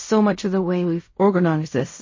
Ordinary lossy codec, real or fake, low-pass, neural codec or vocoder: MP3, 32 kbps; fake; 7.2 kHz; codec, 16 kHz in and 24 kHz out, 0.4 kbps, LongCat-Audio-Codec, fine tuned four codebook decoder